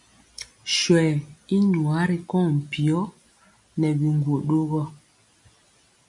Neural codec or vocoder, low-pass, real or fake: none; 10.8 kHz; real